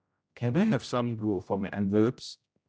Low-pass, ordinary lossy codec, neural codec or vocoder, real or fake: none; none; codec, 16 kHz, 0.5 kbps, X-Codec, HuBERT features, trained on general audio; fake